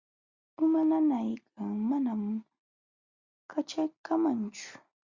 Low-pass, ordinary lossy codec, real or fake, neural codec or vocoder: 7.2 kHz; Opus, 64 kbps; real; none